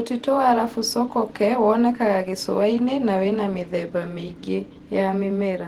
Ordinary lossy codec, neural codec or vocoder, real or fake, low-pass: Opus, 16 kbps; vocoder, 48 kHz, 128 mel bands, Vocos; fake; 19.8 kHz